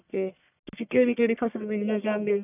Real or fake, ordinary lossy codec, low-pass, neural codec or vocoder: fake; none; 3.6 kHz; codec, 44.1 kHz, 1.7 kbps, Pupu-Codec